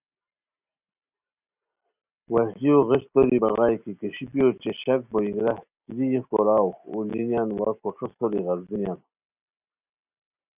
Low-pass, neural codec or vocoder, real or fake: 3.6 kHz; none; real